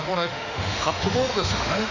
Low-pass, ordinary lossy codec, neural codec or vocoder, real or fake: 7.2 kHz; none; autoencoder, 48 kHz, 32 numbers a frame, DAC-VAE, trained on Japanese speech; fake